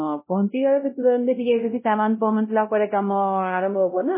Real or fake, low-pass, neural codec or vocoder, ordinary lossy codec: fake; 3.6 kHz; codec, 16 kHz, 0.5 kbps, X-Codec, WavLM features, trained on Multilingual LibriSpeech; MP3, 16 kbps